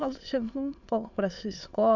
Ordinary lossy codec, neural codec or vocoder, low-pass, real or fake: none; autoencoder, 22.05 kHz, a latent of 192 numbers a frame, VITS, trained on many speakers; 7.2 kHz; fake